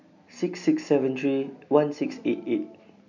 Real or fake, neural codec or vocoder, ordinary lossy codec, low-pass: real; none; none; 7.2 kHz